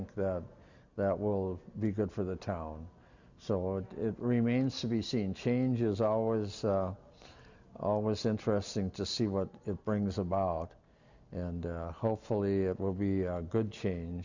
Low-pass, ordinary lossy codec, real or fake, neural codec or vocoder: 7.2 kHz; Opus, 64 kbps; real; none